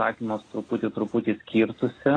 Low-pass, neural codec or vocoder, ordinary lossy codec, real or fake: 9.9 kHz; none; AAC, 32 kbps; real